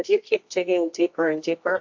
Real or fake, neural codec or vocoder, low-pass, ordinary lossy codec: fake; codec, 24 kHz, 0.9 kbps, WavTokenizer, medium music audio release; 7.2 kHz; MP3, 48 kbps